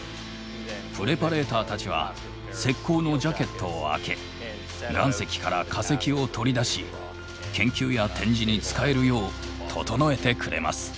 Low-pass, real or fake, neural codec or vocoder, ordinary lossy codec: none; real; none; none